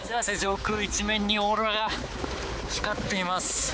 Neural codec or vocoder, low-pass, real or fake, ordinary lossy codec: codec, 16 kHz, 4 kbps, X-Codec, HuBERT features, trained on balanced general audio; none; fake; none